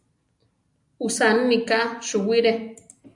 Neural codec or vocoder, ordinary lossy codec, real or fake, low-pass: none; AAC, 64 kbps; real; 10.8 kHz